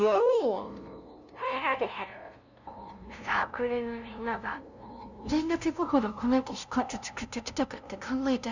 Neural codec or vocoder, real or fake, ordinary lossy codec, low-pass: codec, 16 kHz, 0.5 kbps, FunCodec, trained on LibriTTS, 25 frames a second; fake; none; 7.2 kHz